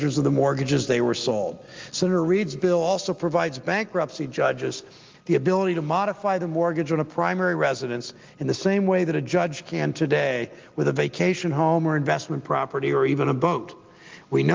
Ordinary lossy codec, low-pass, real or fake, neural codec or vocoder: Opus, 32 kbps; 7.2 kHz; real; none